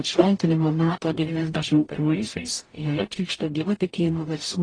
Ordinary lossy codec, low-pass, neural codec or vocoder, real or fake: AAC, 32 kbps; 9.9 kHz; codec, 44.1 kHz, 0.9 kbps, DAC; fake